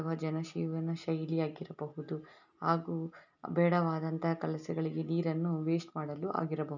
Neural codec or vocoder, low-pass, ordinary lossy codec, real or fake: none; 7.2 kHz; none; real